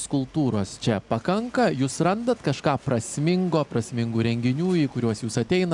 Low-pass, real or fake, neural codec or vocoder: 10.8 kHz; real; none